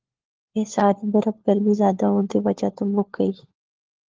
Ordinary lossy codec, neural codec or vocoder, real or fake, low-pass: Opus, 16 kbps; codec, 16 kHz, 4 kbps, FunCodec, trained on LibriTTS, 50 frames a second; fake; 7.2 kHz